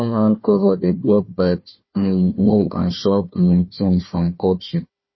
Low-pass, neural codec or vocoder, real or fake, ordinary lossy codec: 7.2 kHz; codec, 16 kHz, 1 kbps, FunCodec, trained on Chinese and English, 50 frames a second; fake; MP3, 24 kbps